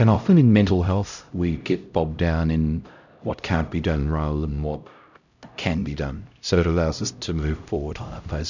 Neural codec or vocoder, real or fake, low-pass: codec, 16 kHz, 0.5 kbps, X-Codec, HuBERT features, trained on LibriSpeech; fake; 7.2 kHz